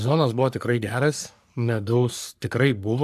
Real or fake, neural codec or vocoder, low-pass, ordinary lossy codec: fake; codec, 44.1 kHz, 3.4 kbps, Pupu-Codec; 14.4 kHz; AAC, 96 kbps